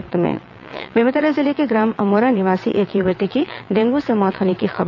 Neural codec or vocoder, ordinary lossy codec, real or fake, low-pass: vocoder, 22.05 kHz, 80 mel bands, WaveNeXt; none; fake; 7.2 kHz